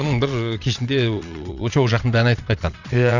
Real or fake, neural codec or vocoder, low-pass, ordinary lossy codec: fake; vocoder, 22.05 kHz, 80 mel bands, WaveNeXt; 7.2 kHz; none